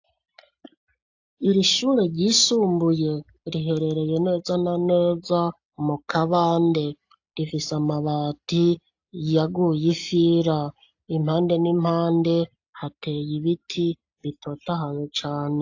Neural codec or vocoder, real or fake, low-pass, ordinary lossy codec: none; real; 7.2 kHz; AAC, 48 kbps